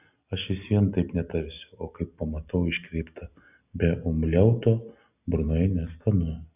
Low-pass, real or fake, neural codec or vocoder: 3.6 kHz; real; none